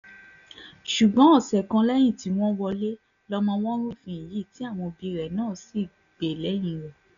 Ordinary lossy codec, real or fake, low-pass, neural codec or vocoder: none; real; 7.2 kHz; none